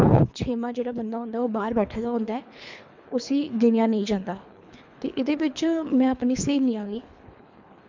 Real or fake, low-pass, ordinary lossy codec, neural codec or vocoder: fake; 7.2 kHz; MP3, 64 kbps; codec, 24 kHz, 3 kbps, HILCodec